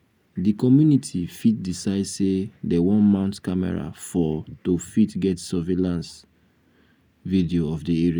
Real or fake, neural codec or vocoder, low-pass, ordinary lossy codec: real; none; none; none